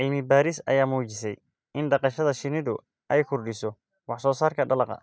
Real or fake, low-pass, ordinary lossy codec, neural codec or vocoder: real; none; none; none